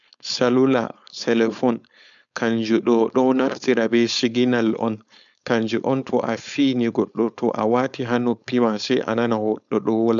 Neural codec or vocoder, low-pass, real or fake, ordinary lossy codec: codec, 16 kHz, 4.8 kbps, FACodec; 7.2 kHz; fake; none